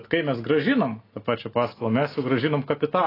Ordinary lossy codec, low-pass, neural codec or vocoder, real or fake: AAC, 24 kbps; 5.4 kHz; none; real